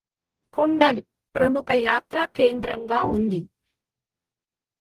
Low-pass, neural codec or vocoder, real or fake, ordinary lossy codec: 14.4 kHz; codec, 44.1 kHz, 0.9 kbps, DAC; fake; Opus, 24 kbps